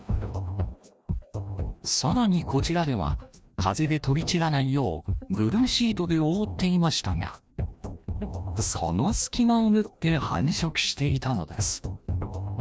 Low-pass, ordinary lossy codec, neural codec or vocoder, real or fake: none; none; codec, 16 kHz, 1 kbps, FreqCodec, larger model; fake